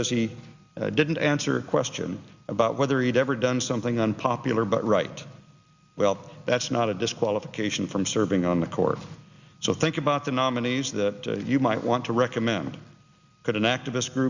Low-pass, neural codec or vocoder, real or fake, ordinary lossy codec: 7.2 kHz; none; real; Opus, 64 kbps